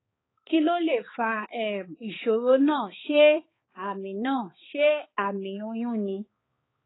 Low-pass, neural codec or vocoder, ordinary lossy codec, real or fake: 7.2 kHz; codec, 16 kHz, 4 kbps, X-Codec, HuBERT features, trained on balanced general audio; AAC, 16 kbps; fake